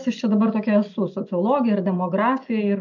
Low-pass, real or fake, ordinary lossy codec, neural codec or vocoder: 7.2 kHz; real; MP3, 64 kbps; none